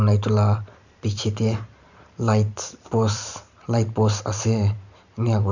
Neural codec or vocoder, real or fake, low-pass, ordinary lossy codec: vocoder, 44.1 kHz, 128 mel bands every 512 samples, BigVGAN v2; fake; 7.2 kHz; none